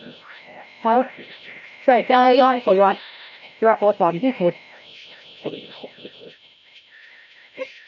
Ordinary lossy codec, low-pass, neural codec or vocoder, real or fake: none; 7.2 kHz; codec, 16 kHz, 0.5 kbps, FreqCodec, larger model; fake